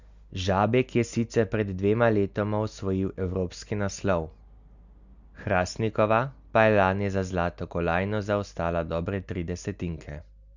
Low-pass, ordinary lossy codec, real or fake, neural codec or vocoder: 7.2 kHz; none; real; none